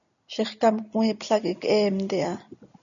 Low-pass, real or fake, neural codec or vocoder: 7.2 kHz; real; none